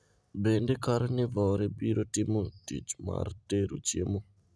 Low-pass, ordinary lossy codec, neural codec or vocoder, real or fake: none; none; none; real